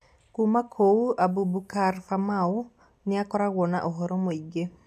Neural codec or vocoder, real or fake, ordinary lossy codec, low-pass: none; real; none; 14.4 kHz